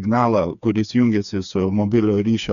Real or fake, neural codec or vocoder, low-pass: fake; codec, 16 kHz, 4 kbps, FreqCodec, smaller model; 7.2 kHz